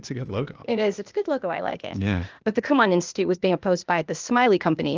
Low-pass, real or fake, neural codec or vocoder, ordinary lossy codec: 7.2 kHz; fake; codec, 16 kHz, 0.8 kbps, ZipCodec; Opus, 24 kbps